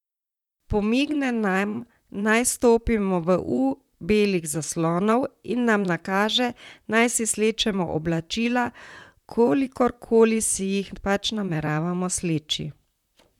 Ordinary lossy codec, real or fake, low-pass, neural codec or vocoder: none; fake; 19.8 kHz; vocoder, 44.1 kHz, 128 mel bands, Pupu-Vocoder